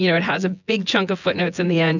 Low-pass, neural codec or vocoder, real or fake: 7.2 kHz; vocoder, 24 kHz, 100 mel bands, Vocos; fake